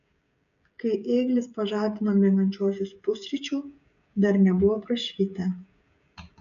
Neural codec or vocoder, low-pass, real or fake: codec, 16 kHz, 16 kbps, FreqCodec, smaller model; 7.2 kHz; fake